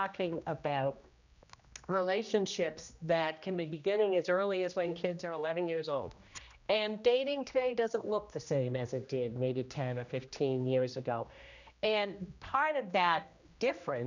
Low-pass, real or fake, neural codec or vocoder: 7.2 kHz; fake; codec, 16 kHz, 1 kbps, X-Codec, HuBERT features, trained on general audio